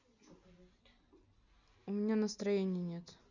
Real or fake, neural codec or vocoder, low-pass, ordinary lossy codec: real; none; 7.2 kHz; none